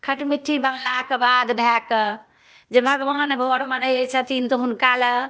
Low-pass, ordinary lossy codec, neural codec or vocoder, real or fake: none; none; codec, 16 kHz, 0.8 kbps, ZipCodec; fake